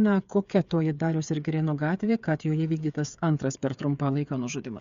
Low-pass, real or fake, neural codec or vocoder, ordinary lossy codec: 7.2 kHz; fake; codec, 16 kHz, 8 kbps, FreqCodec, smaller model; Opus, 64 kbps